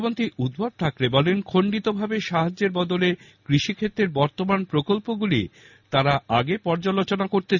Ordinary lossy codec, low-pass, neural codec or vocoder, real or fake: none; 7.2 kHz; none; real